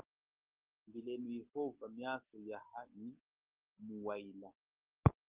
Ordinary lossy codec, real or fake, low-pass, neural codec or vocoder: Opus, 16 kbps; real; 3.6 kHz; none